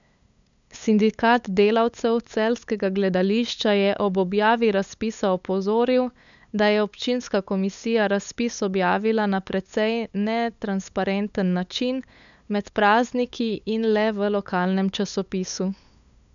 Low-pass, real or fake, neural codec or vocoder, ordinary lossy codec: 7.2 kHz; fake; codec, 16 kHz, 8 kbps, FunCodec, trained on LibriTTS, 25 frames a second; none